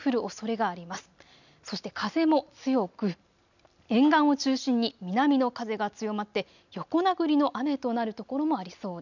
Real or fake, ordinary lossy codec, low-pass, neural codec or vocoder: real; none; 7.2 kHz; none